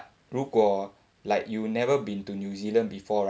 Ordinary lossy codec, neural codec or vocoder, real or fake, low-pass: none; none; real; none